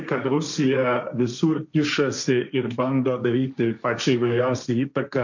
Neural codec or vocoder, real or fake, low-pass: codec, 16 kHz, 1.1 kbps, Voila-Tokenizer; fake; 7.2 kHz